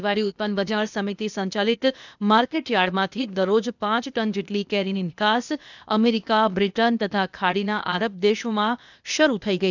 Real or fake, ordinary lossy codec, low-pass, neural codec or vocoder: fake; none; 7.2 kHz; codec, 16 kHz, 0.8 kbps, ZipCodec